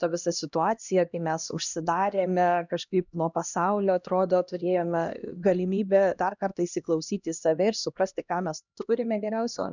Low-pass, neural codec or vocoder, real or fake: 7.2 kHz; codec, 16 kHz, 1 kbps, X-Codec, HuBERT features, trained on LibriSpeech; fake